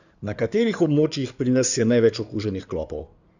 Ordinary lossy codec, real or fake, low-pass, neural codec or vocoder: none; fake; 7.2 kHz; codec, 16 kHz in and 24 kHz out, 2.2 kbps, FireRedTTS-2 codec